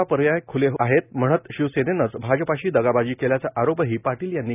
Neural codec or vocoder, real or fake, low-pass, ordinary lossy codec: none; real; 3.6 kHz; none